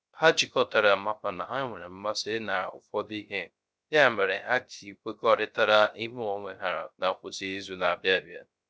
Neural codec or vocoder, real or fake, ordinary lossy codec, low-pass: codec, 16 kHz, 0.3 kbps, FocalCodec; fake; none; none